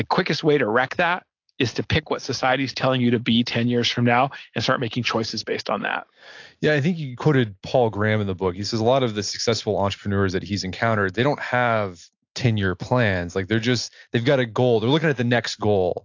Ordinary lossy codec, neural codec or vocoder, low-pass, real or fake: AAC, 48 kbps; none; 7.2 kHz; real